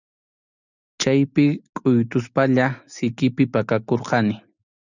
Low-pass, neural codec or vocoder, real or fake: 7.2 kHz; none; real